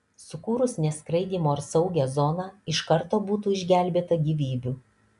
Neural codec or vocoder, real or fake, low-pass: none; real; 10.8 kHz